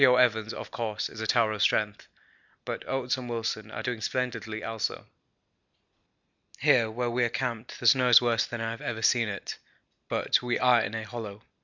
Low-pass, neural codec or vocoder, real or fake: 7.2 kHz; none; real